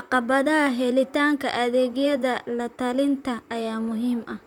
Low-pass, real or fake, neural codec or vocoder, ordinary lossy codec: 19.8 kHz; fake; vocoder, 44.1 kHz, 128 mel bands, Pupu-Vocoder; none